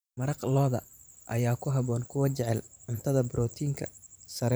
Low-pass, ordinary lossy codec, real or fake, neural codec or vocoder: none; none; fake; vocoder, 44.1 kHz, 128 mel bands every 512 samples, BigVGAN v2